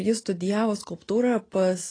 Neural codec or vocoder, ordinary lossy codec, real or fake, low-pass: none; AAC, 32 kbps; real; 9.9 kHz